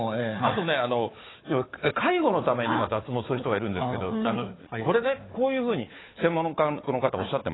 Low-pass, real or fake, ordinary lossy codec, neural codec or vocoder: 7.2 kHz; fake; AAC, 16 kbps; codec, 16 kHz, 4 kbps, FunCodec, trained on Chinese and English, 50 frames a second